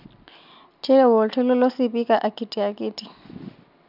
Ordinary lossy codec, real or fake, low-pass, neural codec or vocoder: none; real; 5.4 kHz; none